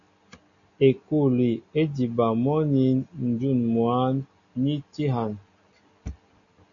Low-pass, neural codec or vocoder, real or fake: 7.2 kHz; none; real